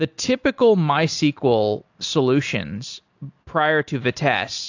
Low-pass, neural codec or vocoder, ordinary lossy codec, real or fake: 7.2 kHz; none; AAC, 48 kbps; real